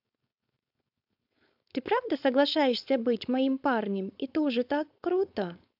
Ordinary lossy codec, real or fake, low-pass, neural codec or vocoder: AAC, 48 kbps; fake; 5.4 kHz; codec, 16 kHz, 4.8 kbps, FACodec